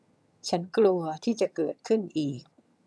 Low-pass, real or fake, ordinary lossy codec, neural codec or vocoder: none; fake; none; vocoder, 22.05 kHz, 80 mel bands, HiFi-GAN